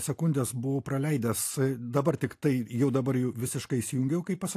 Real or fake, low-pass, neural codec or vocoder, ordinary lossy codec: real; 14.4 kHz; none; AAC, 64 kbps